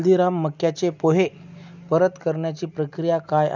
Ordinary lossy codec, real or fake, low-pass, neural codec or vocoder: none; real; 7.2 kHz; none